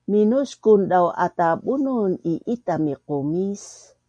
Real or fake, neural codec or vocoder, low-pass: real; none; 9.9 kHz